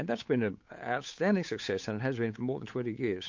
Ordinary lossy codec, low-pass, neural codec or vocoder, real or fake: MP3, 48 kbps; 7.2 kHz; codec, 16 kHz, 8 kbps, FunCodec, trained on Chinese and English, 25 frames a second; fake